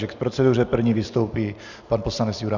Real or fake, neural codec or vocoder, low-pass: real; none; 7.2 kHz